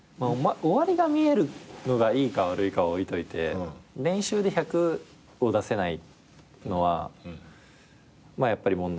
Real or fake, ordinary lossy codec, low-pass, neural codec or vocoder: real; none; none; none